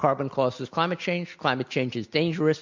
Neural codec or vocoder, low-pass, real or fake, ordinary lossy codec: none; 7.2 kHz; real; MP3, 48 kbps